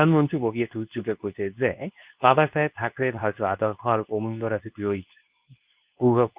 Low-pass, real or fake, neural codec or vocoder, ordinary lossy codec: 3.6 kHz; fake; codec, 24 kHz, 0.9 kbps, WavTokenizer, medium speech release version 2; Opus, 24 kbps